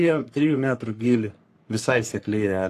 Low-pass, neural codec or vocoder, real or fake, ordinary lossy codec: 14.4 kHz; codec, 44.1 kHz, 3.4 kbps, Pupu-Codec; fake; AAC, 64 kbps